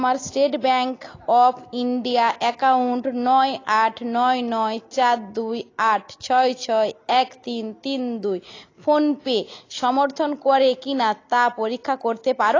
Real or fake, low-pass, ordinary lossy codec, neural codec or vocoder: real; 7.2 kHz; AAC, 32 kbps; none